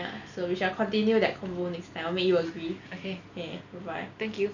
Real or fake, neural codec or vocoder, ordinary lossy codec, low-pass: real; none; none; 7.2 kHz